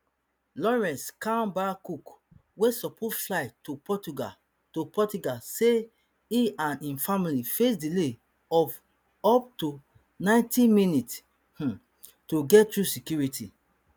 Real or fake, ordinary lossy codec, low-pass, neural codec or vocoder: real; none; none; none